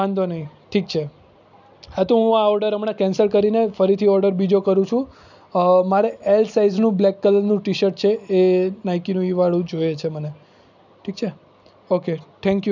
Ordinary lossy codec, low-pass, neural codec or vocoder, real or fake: none; 7.2 kHz; none; real